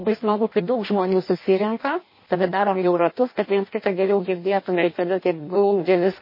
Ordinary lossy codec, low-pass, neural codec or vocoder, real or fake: MP3, 24 kbps; 5.4 kHz; codec, 16 kHz in and 24 kHz out, 0.6 kbps, FireRedTTS-2 codec; fake